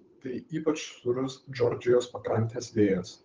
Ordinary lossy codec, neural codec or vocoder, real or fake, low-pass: Opus, 24 kbps; codec, 16 kHz, 8 kbps, FunCodec, trained on Chinese and English, 25 frames a second; fake; 7.2 kHz